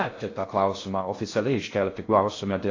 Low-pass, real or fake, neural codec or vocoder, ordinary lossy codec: 7.2 kHz; fake; codec, 16 kHz in and 24 kHz out, 0.6 kbps, FocalCodec, streaming, 2048 codes; AAC, 32 kbps